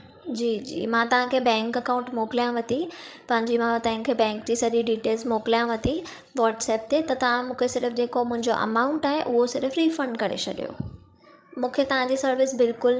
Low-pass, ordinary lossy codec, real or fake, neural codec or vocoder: none; none; fake; codec, 16 kHz, 16 kbps, FreqCodec, larger model